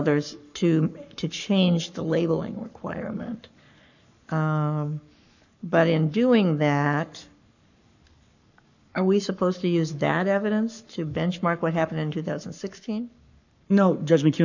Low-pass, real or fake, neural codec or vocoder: 7.2 kHz; fake; codec, 44.1 kHz, 7.8 kbps, Pupu-Codec